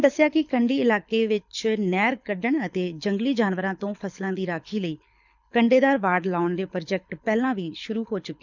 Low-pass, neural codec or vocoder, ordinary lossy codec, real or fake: 7.2 kHz; codec, 24 kHz, 6 kbps, HILCodec; none; fake